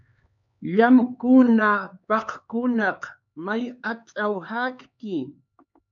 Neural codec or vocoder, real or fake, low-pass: codec, 16 kHz, 4 kbps, X-Codec, HuBERT features, trained on LibriSpeech; fake; 7.2 kHz